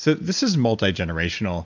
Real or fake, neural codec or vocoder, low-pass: real; none; 7.2 kHz